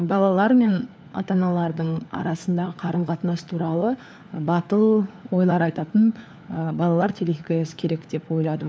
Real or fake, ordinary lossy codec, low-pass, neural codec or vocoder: fake; none; none; codec, 16 kHz, 4 kbps, FunCodec, trained on LibriTTS, 50 frames a second